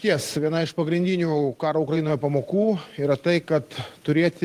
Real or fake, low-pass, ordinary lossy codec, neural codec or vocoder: fake; 14.4 kHz; Opus, 24 kbps; vocoder, 44.1 kHz, 128 mel bands every 512 samples, BigVGAN v2